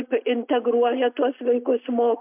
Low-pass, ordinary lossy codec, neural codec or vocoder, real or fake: 3.6 kHz; MP3, 32 kbps; none; real